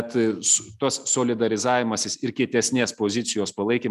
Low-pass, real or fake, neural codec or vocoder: 14.4 kHz; real; none